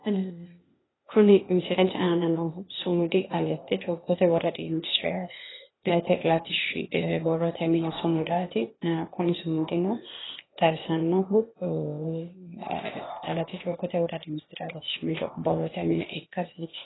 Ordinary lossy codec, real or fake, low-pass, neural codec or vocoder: AAC, 16 kbps; fake; 7.2 kHz; codec, 16 kHz, 0.8 kbps, ZipCodec